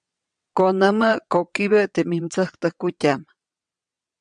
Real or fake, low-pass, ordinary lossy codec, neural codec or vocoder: fake; 9.9 kHz; MP3, 96 kbps; vocoder, 22.05 kHz, 80 mel bands, WaveNeXt